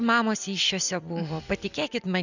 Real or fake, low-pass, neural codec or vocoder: real; 7.2 kHz; none